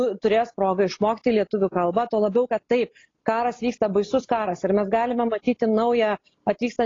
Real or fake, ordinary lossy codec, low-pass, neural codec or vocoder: real; AAC, 32 kbps; 7.2 kHz; none